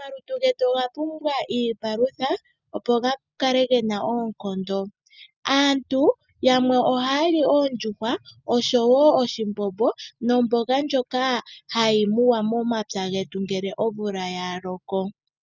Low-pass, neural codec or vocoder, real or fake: 7.2 kHz; none; real